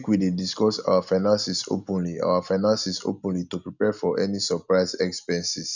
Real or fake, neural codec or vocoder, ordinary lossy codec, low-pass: real; none; none; 7.2 kHz